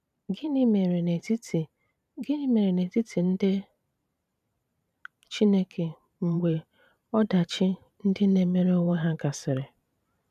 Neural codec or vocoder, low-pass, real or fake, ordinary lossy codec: vocoder, 44.1 kHz, 128 mel bands every 512 samples, BigVGAN v2; 14.4 kHz; fake; none